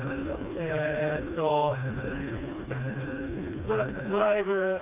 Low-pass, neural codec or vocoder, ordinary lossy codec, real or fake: 3.6 kHz; codec, 16 kHz, 2 kbps, FreqCodec, smaller model; none; fake